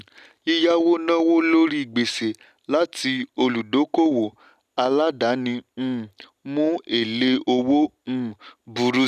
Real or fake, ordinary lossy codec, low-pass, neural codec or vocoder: real; none; 14.4 kHz; none